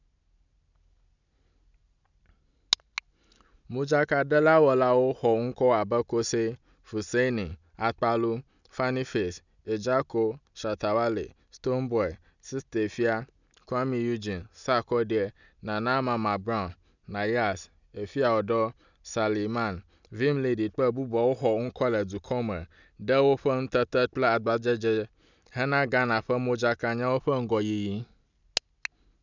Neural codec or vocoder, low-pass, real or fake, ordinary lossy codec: none; 7.2 kHz; real; none